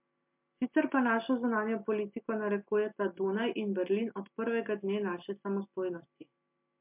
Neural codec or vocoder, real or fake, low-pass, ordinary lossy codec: none; real; 3.6 kHz; MP3, 32 kbps